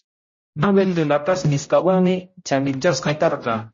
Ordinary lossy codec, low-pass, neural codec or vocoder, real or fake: MP3, 32 kbps; 7.2 kHz; codec, 16 kHz, 0.5 kbps, X-Codec, HuBERT features, trained on general audio; fake